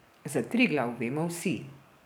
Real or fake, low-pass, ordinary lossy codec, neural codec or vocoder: fake; none; none; codec, 44.1 kHz, 7.8 kbps, DAC